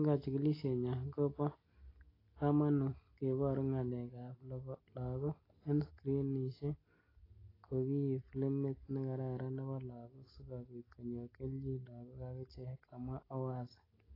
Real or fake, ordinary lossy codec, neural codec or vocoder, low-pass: real; AAC, 24 kbps; none; 5.4 kHz